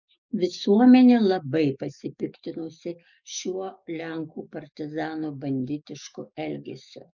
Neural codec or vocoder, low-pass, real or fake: codec, 44.1 kHz, 7.8 kbps, DAC; 7.2 kHz; fake